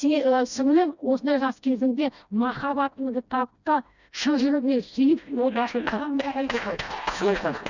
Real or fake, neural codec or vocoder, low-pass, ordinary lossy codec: fake; codec, 16 kHz, 1 kbps, FreqCodec, smaller model; 7.2 kHz; none